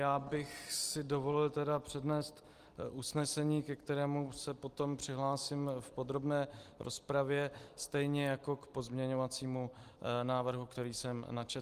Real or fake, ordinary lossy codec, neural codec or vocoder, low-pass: real; Opus, 24 kbps; none; 14.4 kHz